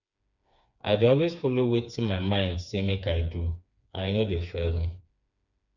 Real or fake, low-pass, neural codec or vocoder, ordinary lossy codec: fake; 7.2 kHz; codec, 16 kHz, 4 kbps, FreqCodec, smaller model; none